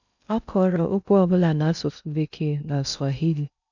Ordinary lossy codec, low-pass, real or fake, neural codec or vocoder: none; 7.2 kHz; fake; codec, 16 kHz in and 24 kHz out, 0.6 kbps, FocalCodec, streaming, 2048 codes